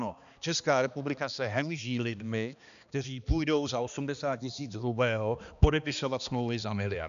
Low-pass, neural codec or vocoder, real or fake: 7.2 kHz; codec, 16 kHz, 2 kbps, X-Codec, HuBERT features, trained on balanced general audio; fake